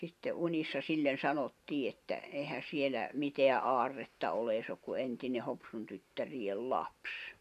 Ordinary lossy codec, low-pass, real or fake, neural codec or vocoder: none; 14.4 kHz; fake; vocoder, 44.1 kHz, 128 mel bands every 512 samples, BigVGAN v2